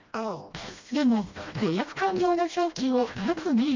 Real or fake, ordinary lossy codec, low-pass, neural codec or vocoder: fake; MP3, 64 kbps; 7.2 kHz; codec, 16 kHz, 1 kbps, FreqCodec, smaller model